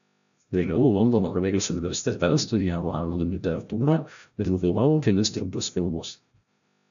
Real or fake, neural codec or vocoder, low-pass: fake; codec, 16 kHz, 0.5 kbps, FreqCodec, larger model; 7.2 kHz